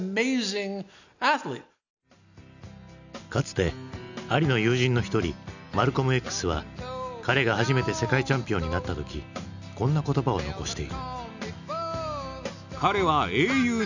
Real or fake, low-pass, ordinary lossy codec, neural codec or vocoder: real; 7.2 kHz; none; none